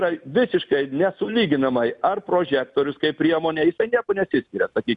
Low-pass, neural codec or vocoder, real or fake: 10.8 kHz; none; real